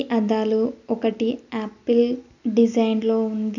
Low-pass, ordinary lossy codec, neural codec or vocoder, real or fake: 7.2 kHz; none; none; real